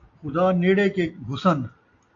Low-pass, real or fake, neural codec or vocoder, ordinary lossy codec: 7.2 kHz; real; none; AAC, 48 kbps